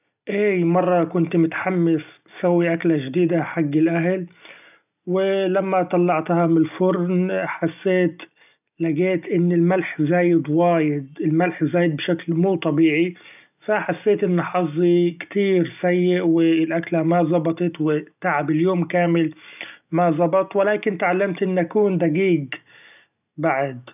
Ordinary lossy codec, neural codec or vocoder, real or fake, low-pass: none; none; real; 3.6 kHz